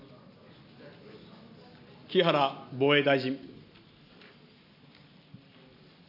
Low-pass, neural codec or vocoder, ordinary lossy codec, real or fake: 5.4 kHz; none; none; real